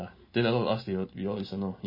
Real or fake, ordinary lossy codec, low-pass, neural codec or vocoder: real; MP3, 24 kbps; 5.4 kHz; none